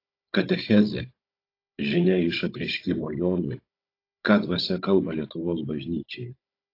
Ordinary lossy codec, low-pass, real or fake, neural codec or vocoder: AAC, 32 kbps; 5.4 kHz; fake; codec, 16 kHz, 16 kbps, FunCodec, trained on Chinese and English, 50 frames a second